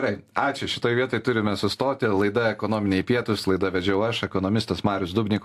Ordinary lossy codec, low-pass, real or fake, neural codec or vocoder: AAC, 64 kbps; 14.4 kHz; real; none